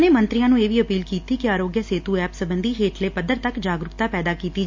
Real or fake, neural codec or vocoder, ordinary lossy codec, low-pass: real; none; MP3, 48 kbps; 7.2 kHz